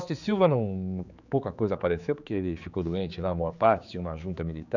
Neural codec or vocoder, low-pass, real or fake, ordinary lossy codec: codec, 16 kHz, 4 kbps, X-Codec, HuBERT features, trained on general audio; 7.2 kHz; fake; none